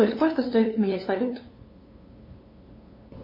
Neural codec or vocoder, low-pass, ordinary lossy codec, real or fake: codec, 16 kHz, 2 kbps, FunCodec, trained on LibriTTS, 25 frames a second; 5.4 kHz; MP3, 24 kbps; fake